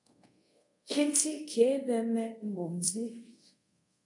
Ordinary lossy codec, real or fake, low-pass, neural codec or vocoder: MP3, 64 kbps; fake; 10.8 kHz; codec, 24 kHz, 0.5 kbps, DualCodec